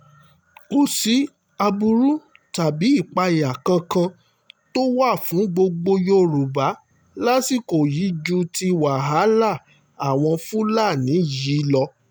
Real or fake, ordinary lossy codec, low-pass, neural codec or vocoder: real; none; none; none